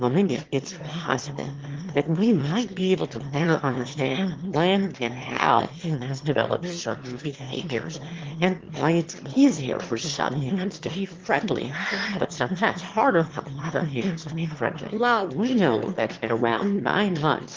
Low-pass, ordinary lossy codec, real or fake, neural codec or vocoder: 7.2 kHz; Opus, 16 kbps; fake; autoencoder, 22.05 kHz, a latent of 192 numbers a frame, VITS, trained on one speaker